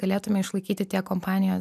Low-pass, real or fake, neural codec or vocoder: 14.4 kHz; real; none